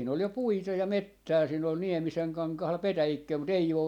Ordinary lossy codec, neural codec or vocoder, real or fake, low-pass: none; none; real; 19.8 kHz